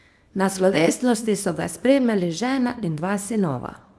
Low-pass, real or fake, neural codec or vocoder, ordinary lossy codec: none; fake; codec, 24 kHz, 0.9 kbps, WavTokenizer, small release; none